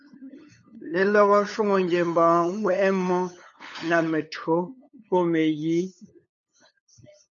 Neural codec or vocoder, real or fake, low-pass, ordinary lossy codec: codec, 16 kHz, 8 kbps, FunCodec, trained on LibriTTS, 25 frames a second; fake; 7.2 kHz; MP3, 96 kbps